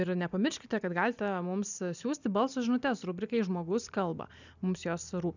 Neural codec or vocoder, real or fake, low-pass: none; real; 7.2 kHz